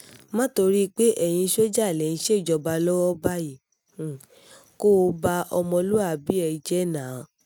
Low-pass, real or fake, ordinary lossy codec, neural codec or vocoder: none; real; none; none